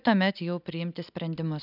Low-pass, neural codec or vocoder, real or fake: 5.4 kHz; none; real